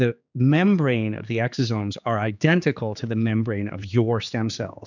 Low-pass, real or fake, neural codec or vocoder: 7.2 kHz; fake; codec, 16 kHz, 4 kbps, X-Codec, HuBERT features, trained on general audio